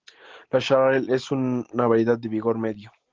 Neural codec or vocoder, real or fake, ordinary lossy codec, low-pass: none; real; Opus, 16 kbps; 7.2 kHz